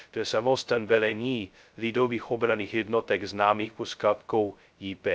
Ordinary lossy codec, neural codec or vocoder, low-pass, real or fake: none; codec, 16 kHz, 0.2 kbps, FocalCodec; none; fake